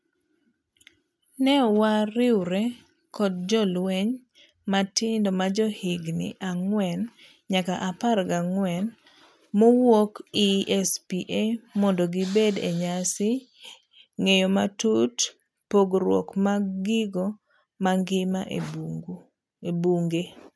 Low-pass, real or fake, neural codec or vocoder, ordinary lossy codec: none; real; none; none